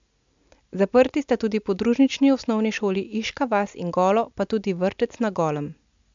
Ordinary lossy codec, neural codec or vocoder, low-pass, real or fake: MP3, 64 kbps; none; 7.2 kHz; real